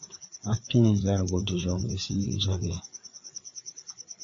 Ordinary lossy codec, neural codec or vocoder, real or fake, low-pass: AAC, 48 kbps; codec, 16 kHz, 4 kbps, FreqCodec, larger model; fake; 7.2 kHz